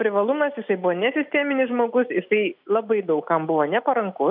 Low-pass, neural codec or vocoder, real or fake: 5.4 kHz; none; real